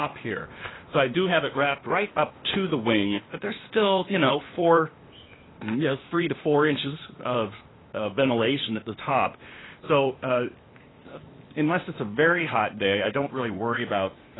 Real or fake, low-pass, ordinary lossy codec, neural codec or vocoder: fake; 7.2 kHz; AAC, 16 kbps; codec, 16 kHz, 0.8 kbps, ZipCodec